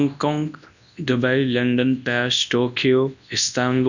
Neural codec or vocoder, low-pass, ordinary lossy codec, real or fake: codec, 24 kHz, 0.9 kbps, WavTokenizer, large speech release; 7.2 kHz; none; fake